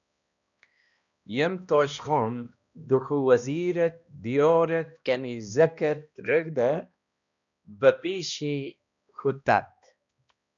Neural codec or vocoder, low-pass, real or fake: codec, 16 kHz, 1 kbps, X-Codec, HuBERT features, trained on balanced general audio; 7.2 kHz; fake